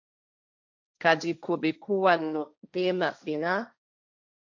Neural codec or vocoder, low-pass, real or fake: codec, 16 kHz, 1.1 kbps, Voila-Tokenizer; 7.2 kHz; fake